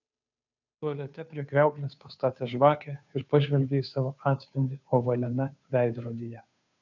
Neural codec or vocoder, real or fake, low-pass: codec, 16 kHz, 2 kbps, FunCodec, trained on Chinese and English, 25 frames a second; fake; 7.2 kHz